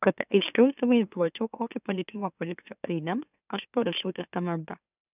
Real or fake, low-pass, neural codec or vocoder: fake; 3.6 kHz; autoencoder, 44.1 kHz, a latent of 192 numbers a frame, MeloTTS